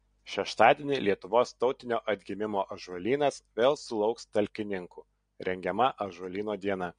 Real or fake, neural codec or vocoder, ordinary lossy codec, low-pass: real; none; MP3, 48 kbps; 9.9 kHz